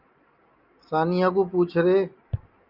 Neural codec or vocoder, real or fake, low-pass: none; real; 5.4 kHz